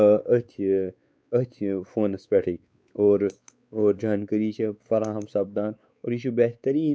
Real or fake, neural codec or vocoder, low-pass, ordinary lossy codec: fake; codec, 16 kHz, 4 kbps, X-Codec, WavLM features, trained on Multilingual LibriSpeech; none; none